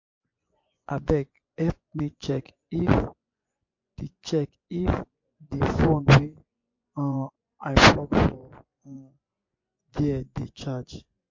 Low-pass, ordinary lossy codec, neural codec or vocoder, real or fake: 7.2 kHz; MP3, 48 kbps; vocoder, 24 kHz, 100 mel bands, Vocos; fake